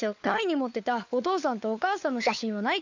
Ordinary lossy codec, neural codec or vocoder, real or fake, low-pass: MP3, 64 kbps; codec, 16 kHz, 4 kbps, FunCodec, trained on LibriTTS, 50 frames a second; fake; 7.2 kHz